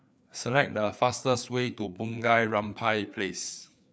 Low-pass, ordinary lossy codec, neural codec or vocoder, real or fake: none; none; codec, 16 kHz, 4 kbps, FreqCodec, larger model; fake